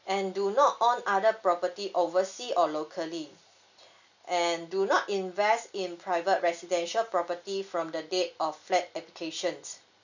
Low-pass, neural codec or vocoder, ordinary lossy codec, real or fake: 7.2 kHz; none; none; real